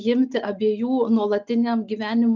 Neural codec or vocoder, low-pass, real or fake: none; 7.2 kHz; real